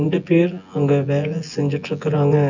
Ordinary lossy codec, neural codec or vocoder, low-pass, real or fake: none; vocoder, 24 kHz, 100 mel bands, Vocos; 7.2 kHz; fake